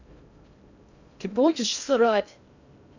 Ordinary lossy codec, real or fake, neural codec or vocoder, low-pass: none; fake; codec, 16 kHz in and 24 kHz out, 0.6 kbps, FocalCodec, streaming, 2048 codes; 7.2 kHz